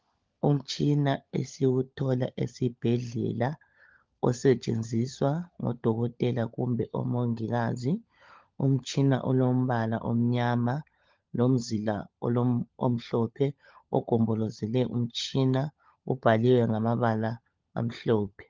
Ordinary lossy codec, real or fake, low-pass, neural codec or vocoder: Opus, 32 kbps; fake; 7.2 kHz; codec, 16 kHz, 16 kbps, FunCodec, trained on LibriTTS, 50 frames a second